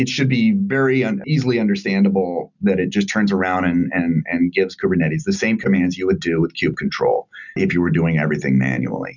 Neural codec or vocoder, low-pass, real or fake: none; 7.2 kHz; real